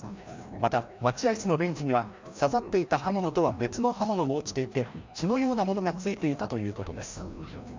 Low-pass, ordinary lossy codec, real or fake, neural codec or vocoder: 7.2 kHz; MP3, 64 kbps; fake; codec, 16 kHz, 1 kbps, FreqCodec, larger model